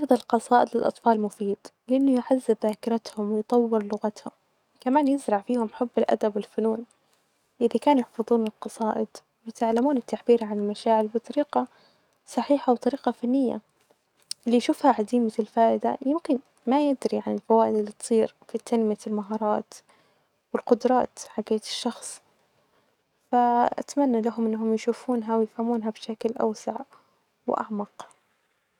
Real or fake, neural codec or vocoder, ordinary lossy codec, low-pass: fake; codec, 44.1 kHz, 7.8 kbps, DAC; none; 19.8 kHz